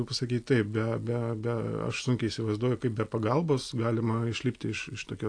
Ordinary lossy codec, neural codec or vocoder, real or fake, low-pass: AAC, 48 kbps; none; real; 9.9 kHz